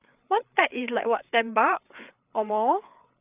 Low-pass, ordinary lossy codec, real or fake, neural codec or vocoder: 3.6 kHz; none; fake; codec, 16 kHz, 4 kbps, FreqCodec, larger model